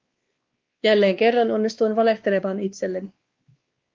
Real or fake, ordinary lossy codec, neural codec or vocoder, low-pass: fake; Opus, 32 kbps; codec, 16 kHz, 2 kbps, X-Codec, WavLM features, trained on Multilingual LibriSpeech; 7.2 kHz